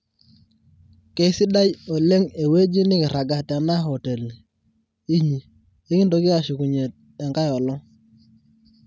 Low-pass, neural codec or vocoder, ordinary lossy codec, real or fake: none; none; none; real